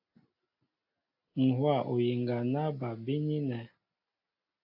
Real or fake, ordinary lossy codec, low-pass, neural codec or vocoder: real; AAC, 32 kbps; 5.4 kHz; none